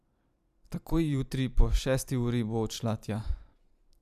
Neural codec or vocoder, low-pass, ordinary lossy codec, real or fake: vocoder, 44.1 kHz, 128 mel bands every 512 samples, BigVGAN v2; 14.4 kHz; none; fake